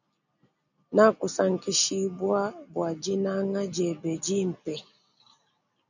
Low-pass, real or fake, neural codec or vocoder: 7.2 kHz; real; none